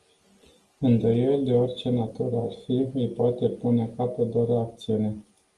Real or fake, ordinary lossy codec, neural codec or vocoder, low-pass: real; Opus, 24 kbps; none; 10.8 kHz